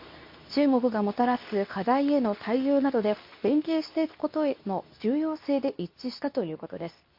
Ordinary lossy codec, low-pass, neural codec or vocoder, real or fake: MP3, 32 kbps; 5.4 kHz; codec, 24 kHz, 0.9 kbps, WavTokenizer, medium speech release version 2; fake